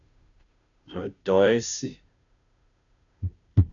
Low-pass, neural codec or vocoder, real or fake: 7.2 kHz; codec, 16 kHz, 0.5 kbps, FunCodec, trained on Chinese and English, 25 frames a second; fake